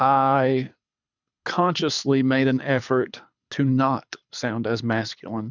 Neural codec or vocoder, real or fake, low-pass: codec, 24 kHz, 6 kbps, HILCodec; fake; 7.2 kHz